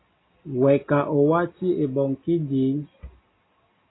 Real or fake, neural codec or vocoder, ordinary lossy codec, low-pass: real; none; AAC, 16 kbps; 7.2 kHz